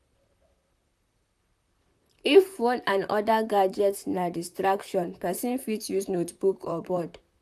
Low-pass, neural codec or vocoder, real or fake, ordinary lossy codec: 14.4 kHz; vocoder, 44.1 kHz, 128 mel bands, Pupu-Vocoder; fake; none